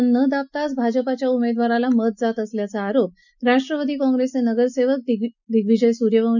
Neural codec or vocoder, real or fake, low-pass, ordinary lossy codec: none; real; 7.2 kHz; none